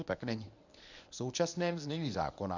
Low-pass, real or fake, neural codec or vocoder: 7.2 kHz; fake; codec, 24 kHz, 0.9 kbps, WavTokenizer, medium speech release version 1